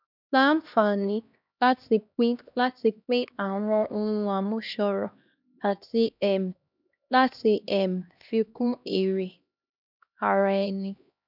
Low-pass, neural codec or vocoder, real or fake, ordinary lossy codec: 5.4 kHz; codec, 16 kHz, 1 kbps, X-Codec, HuBERT features, trained on LibriSpeech; fake; none